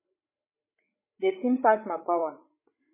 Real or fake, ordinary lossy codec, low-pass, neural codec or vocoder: fake; MP3, 16 kbps; 3.6 kHz; codec, 16 kHz, 8 kbps, FreqCodec, larger model